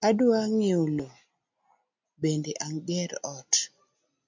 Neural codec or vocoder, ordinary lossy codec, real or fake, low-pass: none; MP3, 64 kbps; real; 7.2 kHz